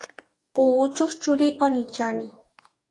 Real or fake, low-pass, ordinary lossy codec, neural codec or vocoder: fake; 10.8 kHz; AAC, 48 kbps; codec, 44.1 kHz, 2.6 kbps, DAC